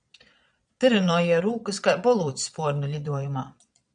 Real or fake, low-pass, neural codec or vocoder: fake; 9.9 kHz; vocoder, 22.05 kHz, 80 mel bands, Vocos